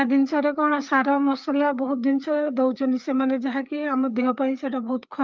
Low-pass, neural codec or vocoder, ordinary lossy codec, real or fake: 7.2 kHz; codec, 16 kHz, 4 kbps, FreqCodec, larger model; Opus, 24 kbps; fake